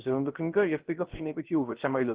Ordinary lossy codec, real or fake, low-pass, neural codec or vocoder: Opus, 16 kbps; fake; 3.6 kHz; codec, 16 kHz, 0.3 kbps, FocalCodec